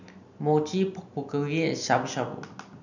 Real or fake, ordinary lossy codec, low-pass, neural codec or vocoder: real; none; 7.2 kHz; none